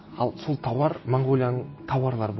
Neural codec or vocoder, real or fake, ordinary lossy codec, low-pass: none; real; MP3, 24 kbps; 7.2 kHz